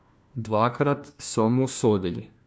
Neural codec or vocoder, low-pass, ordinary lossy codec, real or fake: codec, 16 kHz, 1 kbps, FunCodec, trained on LibriTTS, 50 frames a second; none; none; fake